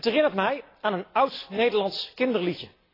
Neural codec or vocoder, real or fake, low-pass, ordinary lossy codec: none; real; 5.4 kHz; AAC, 24 kbps